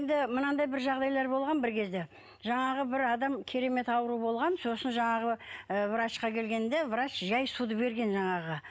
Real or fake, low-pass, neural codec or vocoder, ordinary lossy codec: real; none; none; none